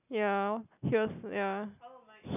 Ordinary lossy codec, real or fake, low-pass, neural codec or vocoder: none; real; 3.6 kHz; none